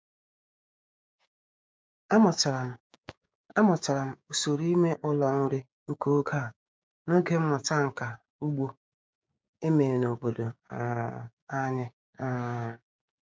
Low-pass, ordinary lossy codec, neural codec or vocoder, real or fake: none; none; codec, 16 kHz, 6 kbps, DAC; fake